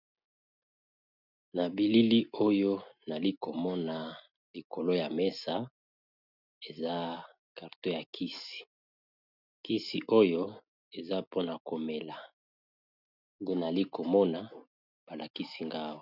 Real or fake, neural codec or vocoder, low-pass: real; none; 5.4 kHz